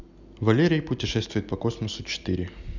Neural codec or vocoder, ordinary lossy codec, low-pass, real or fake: none; none; 7.2 kHz; real